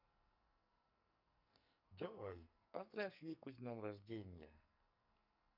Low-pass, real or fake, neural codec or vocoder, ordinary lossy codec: 5.4 kHz; fake; codec, 44.1 kHz, 2.6 kbps, SNAC; none